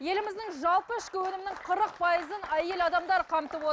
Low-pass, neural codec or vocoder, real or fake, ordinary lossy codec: none; none; real; none